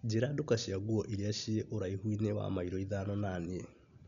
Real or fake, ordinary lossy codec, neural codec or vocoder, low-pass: real; none; none; 7.2 kHz